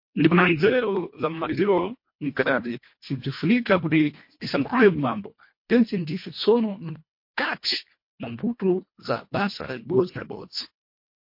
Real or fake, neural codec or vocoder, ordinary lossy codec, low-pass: fake; codec, 24 kHz, 1.5 kbps, HILCodec; MP3, 32 kbps; 5.4 kHz